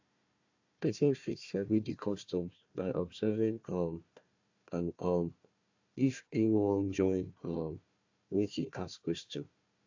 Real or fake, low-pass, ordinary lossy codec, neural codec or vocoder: fake; 7.2 kHz; AAC, 48 kbps; codec, 16 kHz, 1 kbps, FunCodec, trained on Chinese and English, 50 frames a second